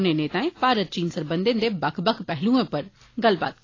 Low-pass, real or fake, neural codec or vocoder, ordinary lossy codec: 7.2 kHz; real; none; AAC, 32 kbps